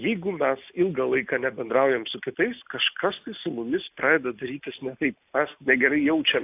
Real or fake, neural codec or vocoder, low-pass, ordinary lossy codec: real; none; 3.6 kHz; AAC, 32 kbps